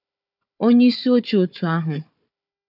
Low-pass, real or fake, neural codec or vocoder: 5.4 kHz; fake; codec, 16 kHz, 4 kbps, FunCodec, trained on Chinese and English, 50 frames a second